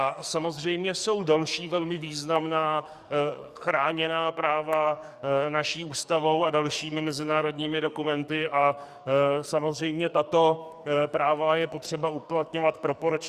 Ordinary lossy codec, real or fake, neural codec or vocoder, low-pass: Opus, 64 kbps; fake; codec, 44.1 kHz, 2.6 kbps, SNAC; 14.4 kHz